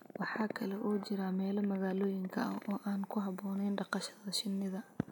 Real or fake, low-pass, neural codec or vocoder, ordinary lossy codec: real; none; none; none